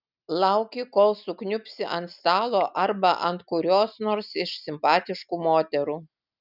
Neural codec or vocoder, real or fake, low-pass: none; real; 5.4 kHz